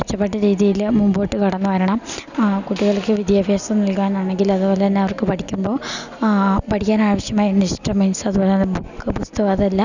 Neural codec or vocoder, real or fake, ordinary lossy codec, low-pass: none; real; none; 7.2 kHz